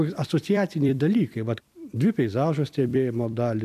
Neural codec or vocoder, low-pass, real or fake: vocoder, 44.1 kHz, 128 mel bands every 256 samples, BigVGAN v2; 14.4 kHz; fake